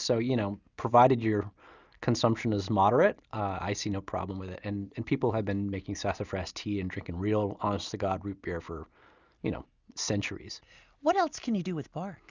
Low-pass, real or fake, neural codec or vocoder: 7.2 kHz; real; none